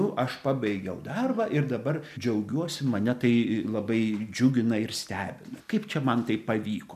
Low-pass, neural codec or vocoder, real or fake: 14.4 kHz; none; real